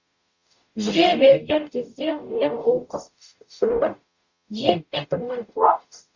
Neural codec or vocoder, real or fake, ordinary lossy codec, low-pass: codec, 44.1 kHz, 0.9 kbps, DAC; fake; Opus, 64 kbps; 7.2 kHz